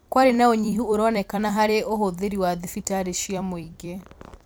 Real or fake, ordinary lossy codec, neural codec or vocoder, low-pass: fake; none; vocoder, 44.1 kHz, 128 mel bands every 256 samples, BigVGAN v2; none